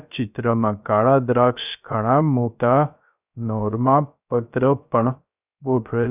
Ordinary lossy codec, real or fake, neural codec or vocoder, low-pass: none; fake; codec, 16 kHz, 0.3 kbps, FocalCodec; 3.6 kHz